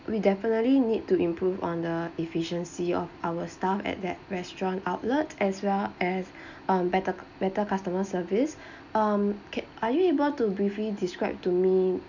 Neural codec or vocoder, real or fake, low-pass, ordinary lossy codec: none; real; 7.2 kHz; none